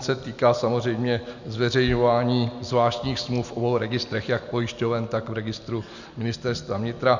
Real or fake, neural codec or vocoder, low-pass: real; none; 7.2 kHz